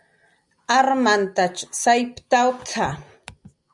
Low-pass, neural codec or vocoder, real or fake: 10.8 kHz; none; real